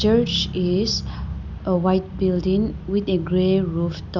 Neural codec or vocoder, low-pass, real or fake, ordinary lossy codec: none; 7.2 kHz; real; none